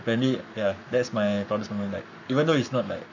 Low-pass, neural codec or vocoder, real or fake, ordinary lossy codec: 7.2 kHz; codec, 44.1 kHz, 7.8 kbps, Pupu-Codec; fake; none